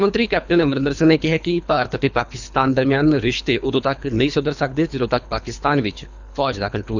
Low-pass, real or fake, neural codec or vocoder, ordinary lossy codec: 7.2 kHz; fake; codec, 24 kHz, 3 kbps, HILCodec; none